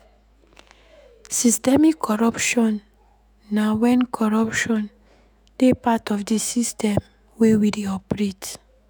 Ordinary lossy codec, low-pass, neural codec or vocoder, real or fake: none; none; autoencoder, 48 kHz, 128 numbers a frame, DAC-VAE, trained on Japanese speech; fake